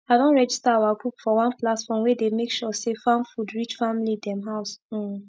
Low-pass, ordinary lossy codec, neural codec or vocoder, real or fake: none; none; none; real